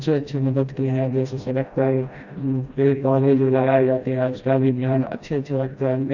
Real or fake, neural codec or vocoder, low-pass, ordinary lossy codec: fake; codec, 16 kHz, 1 kbps, FreqCodec, smaller model; 7.2 kHz; none